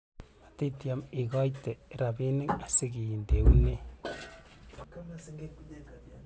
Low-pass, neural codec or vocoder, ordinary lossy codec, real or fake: none; none; none; real